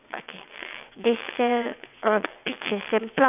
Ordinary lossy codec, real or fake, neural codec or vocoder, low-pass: none; fake; vocoder, 22.05 kHz, 80 mel bands, WaveNeXt; 3.6 kHz